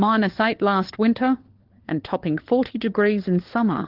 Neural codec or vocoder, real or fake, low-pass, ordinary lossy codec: codec, 16 kHz, 4 kbps, FunCodec, trained on LibriTTS, 50 frames a second; fake; 5.4 kHz; Opus, 16 kbps